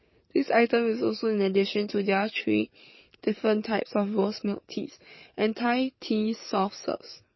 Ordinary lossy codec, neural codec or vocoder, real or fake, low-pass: MP3, 24 kbps; codec, 44.1 kHz, 7.8 kbps, DAC; fake; 7.2 kHz